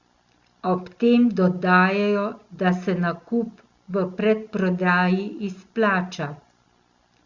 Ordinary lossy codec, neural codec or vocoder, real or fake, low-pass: Opus, 64 kbps; none; real; 7.2 kHz